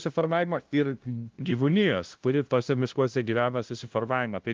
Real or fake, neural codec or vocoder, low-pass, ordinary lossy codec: fake; codec, 16 kHz, 0.5 kbps, FunCodec, trained on LibriTTS, 25 frames a second; 7.2 kHz; Opus, 32 kbps